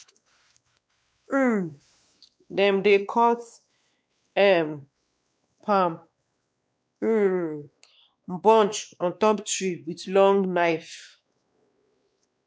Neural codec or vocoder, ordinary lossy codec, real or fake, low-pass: codec, 16 kHz, 2 kbps, X-Codec, WavLM features, trained on Multilingual LibriSpeech; none; fake; none